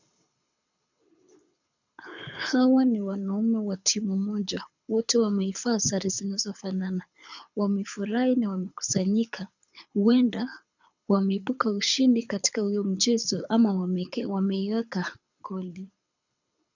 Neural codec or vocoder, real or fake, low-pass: codec, 24 kHz, 6 kbps, HILCodec; fake; 7.2 kHz